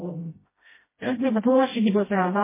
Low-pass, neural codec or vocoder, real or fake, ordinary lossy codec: 3.6 kHz; codec, 16 kHz, 0.5 kbps, FreqCodec, smaller model; fake; MP3, 16 kbps